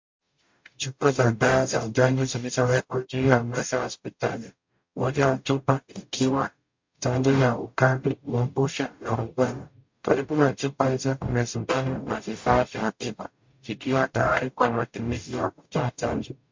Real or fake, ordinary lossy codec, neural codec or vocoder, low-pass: fake; MP3, 48 kbps; codec, 44.1 kHz, 0.9 kbps, DAC; 7.2 kHz